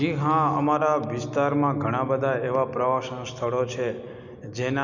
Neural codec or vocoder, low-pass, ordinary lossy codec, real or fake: none; 7.2 kHz; none; real